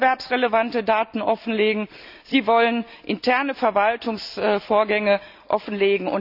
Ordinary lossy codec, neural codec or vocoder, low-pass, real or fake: none; none; 5.4 kHz; real